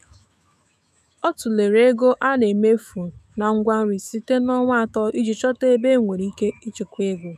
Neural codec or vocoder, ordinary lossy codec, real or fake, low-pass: autoencoder, 48 kHz, 128 numbers a frame, DAC-VAE, trained on Japanese speech; none; fake; 14.4 kHz